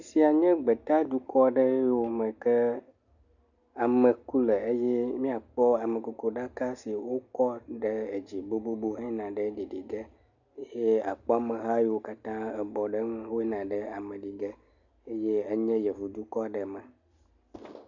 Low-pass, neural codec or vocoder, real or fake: 7.2 kHz; none; real